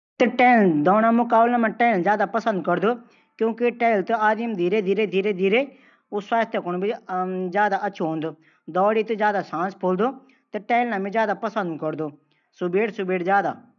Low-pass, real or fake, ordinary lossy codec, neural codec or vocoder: 7.2 kHz; real; none; none